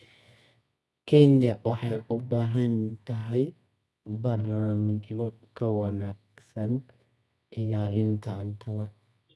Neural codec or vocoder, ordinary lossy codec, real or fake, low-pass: codec, 24 kHz, 0.9 kbps, WavTokenizer, medium music audio release; none; fake; none